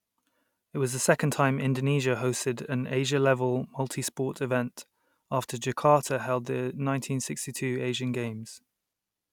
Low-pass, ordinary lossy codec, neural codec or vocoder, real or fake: 19.8 kHz; none; none; real